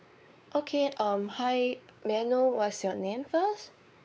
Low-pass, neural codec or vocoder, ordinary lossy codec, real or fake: none; codec, 16 kHz, 4 kbps, X-Codec, WavLM features, trained on Multilingual LibriSpeech; none; fake